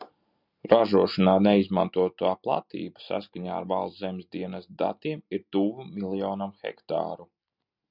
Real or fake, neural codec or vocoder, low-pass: real; none; 5.4 kHz